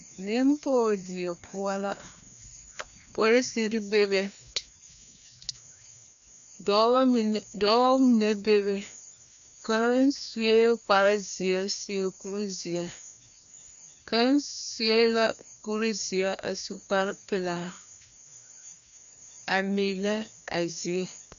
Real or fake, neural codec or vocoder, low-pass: fake; codec, 16 kHz, 1 kbps, FreqCodec, larger model; 7.2 kHz